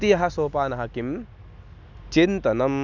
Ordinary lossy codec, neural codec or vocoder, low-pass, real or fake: none; none; 7.2 kHz; real